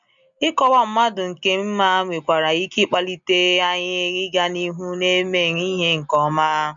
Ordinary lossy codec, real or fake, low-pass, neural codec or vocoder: none; real; 7.2 kHz; none